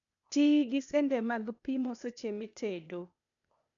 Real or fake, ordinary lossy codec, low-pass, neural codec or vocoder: fake; none; 7.2 kHz; codec, 16 kHz, 0.8 kbps, ZipCodec